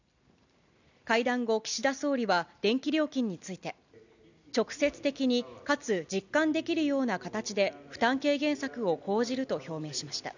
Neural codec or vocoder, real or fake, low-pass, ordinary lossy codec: none; real; 7.2 kHz; none